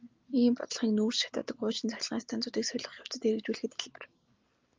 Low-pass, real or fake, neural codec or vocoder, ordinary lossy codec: 7.2 kHz; real; none; Opus, 32 kbps